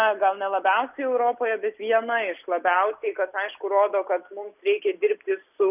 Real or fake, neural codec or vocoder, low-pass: real; none; 3.6 kHz